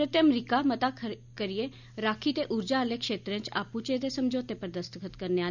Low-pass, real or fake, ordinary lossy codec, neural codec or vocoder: 7.2 kHz; real; none; none